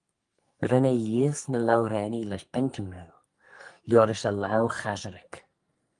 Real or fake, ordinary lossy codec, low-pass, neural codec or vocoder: fake; Opus, 32 kbps; 10.8 kHz; codec, 44.1 kHz, 2.6 kbps, SNAC